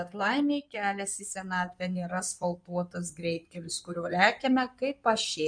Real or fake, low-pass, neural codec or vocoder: fake; 9.9 kHz; codec, 16 kHz in and 24 kHz out, 2.2 kbps, FireRedTTS-2 codec